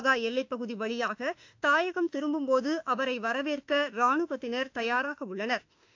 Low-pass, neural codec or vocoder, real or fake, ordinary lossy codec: 7.2 kHz; autoencoder, 48 kHz, 32 numbers a frame, DAC-VAE, trained on Japanese speech; fake; AAC, 48 kbps